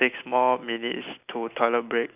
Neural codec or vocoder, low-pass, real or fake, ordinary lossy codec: none; 3.6 kHz; real; none